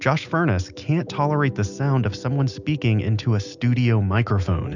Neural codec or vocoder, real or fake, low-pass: none; real; 7.2 kHz